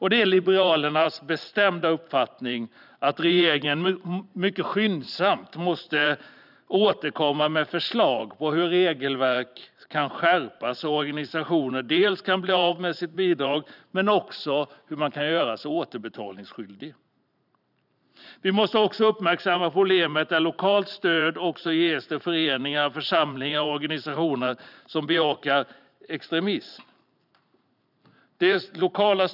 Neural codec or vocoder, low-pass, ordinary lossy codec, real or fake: vocoder, 44.1 kHz, 128 mel bands every 512 samples, BigVGAN v2; 5.4 kHz; none; fake